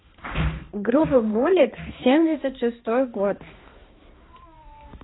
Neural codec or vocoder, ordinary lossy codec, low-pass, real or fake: codec, 16 kHz, 2 kbps, X-Codec, HuBERT features, trained on balanced general audio; AAC, 16 kbps; 7.2 kHz; fake